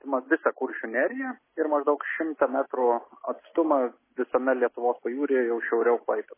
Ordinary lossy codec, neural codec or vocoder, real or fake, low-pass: MP3, 16 kbps; none; real; 3.6 kHz